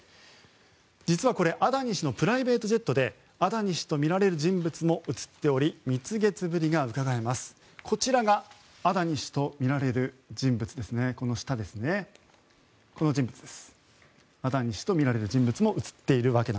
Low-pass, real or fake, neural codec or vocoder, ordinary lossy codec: none; real; none; none